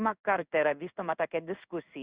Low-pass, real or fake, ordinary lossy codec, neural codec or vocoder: 3.6 kHz; fake; Opus, 64 kbps; codec, 16 kHz in and 24 kHz out, 1 kbps, XY-Tokenizer